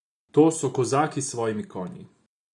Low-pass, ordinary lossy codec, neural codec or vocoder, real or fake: 10.8 kHz; none; none; real